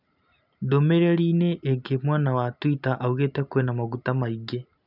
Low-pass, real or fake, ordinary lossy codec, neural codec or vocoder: 5.4 kHz; real; none; none